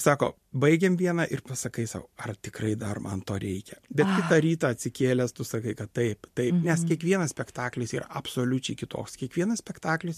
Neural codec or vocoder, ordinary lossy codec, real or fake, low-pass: none; MP3, 64 kbps; real; 14.4 kHz